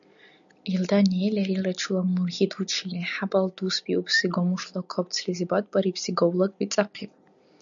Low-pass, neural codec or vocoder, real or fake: 7.2 kHz; none; real